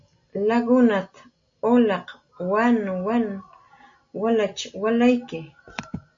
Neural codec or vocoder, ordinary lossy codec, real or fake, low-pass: none; MP3, 48 kbps; real; 7.2 kHz